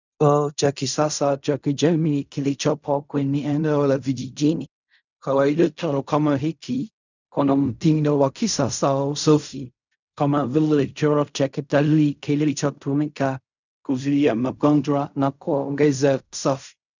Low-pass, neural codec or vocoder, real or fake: 7.2 kHz; codec, 16 kHz in and 24 kHz out, 0.4 kbps, LongCat-Audio-Codec, fine tuned four codebook decoder; fake